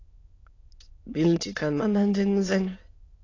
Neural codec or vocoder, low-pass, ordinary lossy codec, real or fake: autoencoder, 22.05 kHz, a latent of 192 numbers a frame, VITS, trained on many speakers; 7.2 kHz; AAC, 32 kbps; fake